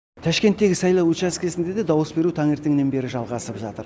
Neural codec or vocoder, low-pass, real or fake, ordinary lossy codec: none; none; real; none